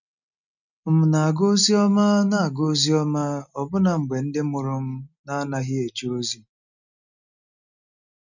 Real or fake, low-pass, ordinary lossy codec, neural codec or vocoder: real; 7.2 kHz; none; none